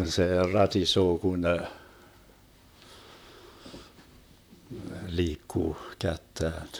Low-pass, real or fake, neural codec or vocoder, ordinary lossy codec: none; fake; vocoder, 44.1 kHz, 128 mel bands, Pupu-Vocoder; none